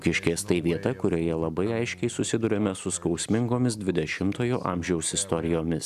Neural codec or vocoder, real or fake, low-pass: none; real; 14.4 kHz